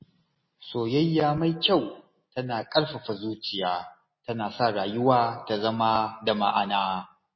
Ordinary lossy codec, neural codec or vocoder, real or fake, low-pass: MP3, 24 kbps; none; real; 7.2 kHz